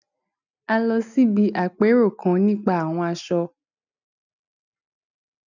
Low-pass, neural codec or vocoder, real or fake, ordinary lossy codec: 7.2 kHz; none; real; none